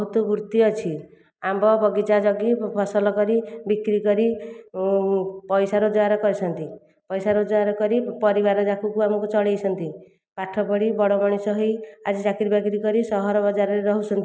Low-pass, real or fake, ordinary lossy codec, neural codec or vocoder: none; real; none; none